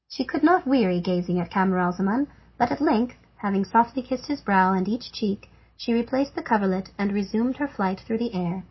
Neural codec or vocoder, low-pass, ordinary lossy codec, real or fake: none; 7.2 kHz; MP3, 24 kbps; real